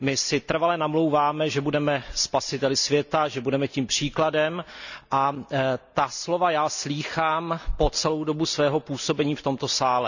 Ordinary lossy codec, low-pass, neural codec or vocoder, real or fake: none; 7.2 kHz; none; real